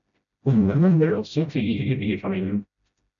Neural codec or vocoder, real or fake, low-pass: codec, 16 kHz, 0.5 kbps, FreqCodec, smaller model; fake; 7.2 kHz